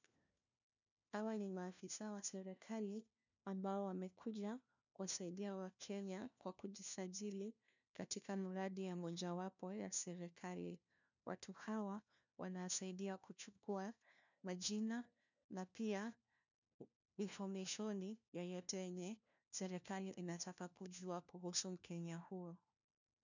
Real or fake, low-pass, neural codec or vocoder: fake; 7.2 kHz; codec, 16 kHz, 1 kbps, FunCodec, trained on LibriTTS, 50 frames a second